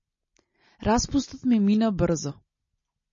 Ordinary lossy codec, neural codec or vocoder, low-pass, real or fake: MP3, 32 kbps; none; 7.2 kHz; real